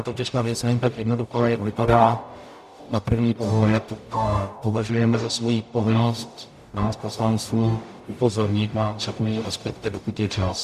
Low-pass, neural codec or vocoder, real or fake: 14.4 kHz; codec, 44.1 kHz, 0.9 kbps, DAC; fake